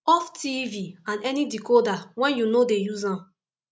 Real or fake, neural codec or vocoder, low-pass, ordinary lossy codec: real; none; none; none